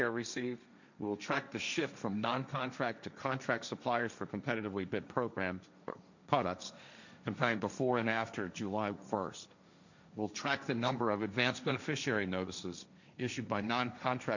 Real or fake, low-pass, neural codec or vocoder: fake; 7.2 kHz; codec, 16 kHz, 1.1 kbps, Voila-Tokenizer